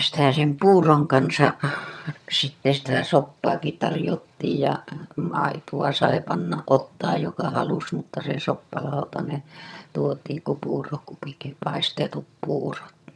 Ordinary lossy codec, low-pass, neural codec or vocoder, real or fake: none; none; vocoder, 22.05 kHz, 80 mel bands, HiFi-GAN; fake